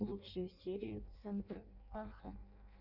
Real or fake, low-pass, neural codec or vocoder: fake; 5.4 kHz; codec, 16 kHz in and 24 kHz out, 0.6 kbps, FireRedTTS-2 codec